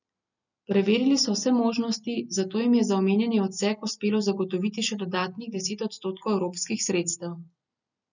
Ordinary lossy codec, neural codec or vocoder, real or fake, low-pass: none; none; real; 7.2 kHz